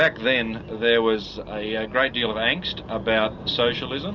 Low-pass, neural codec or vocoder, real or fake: 7.2 kHz; none; real